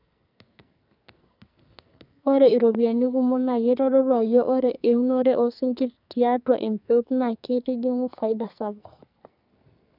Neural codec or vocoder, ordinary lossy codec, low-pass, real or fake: codec, 44.1 kHz, 2.6 kbps, SNAC; none; 5.4 kHz; fake